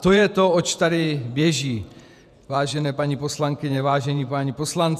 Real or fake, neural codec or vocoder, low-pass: fake; vocoder, 48 kHz, 128 mel bands, Vocos; 14.4 kHz